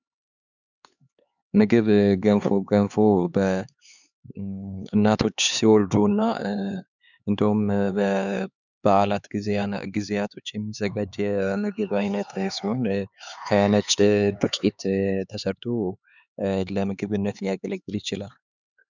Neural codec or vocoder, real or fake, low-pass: codec, 16 kHz, 4 kbps, X-Codec, HuBERT features, trained on LibriSpeech; fake; 7.2 kHz